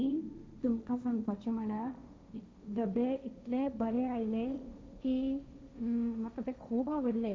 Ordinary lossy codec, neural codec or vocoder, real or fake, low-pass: none; codec, 16 kHz, 1.1 kbps, Voila-Tokenizer; fake; none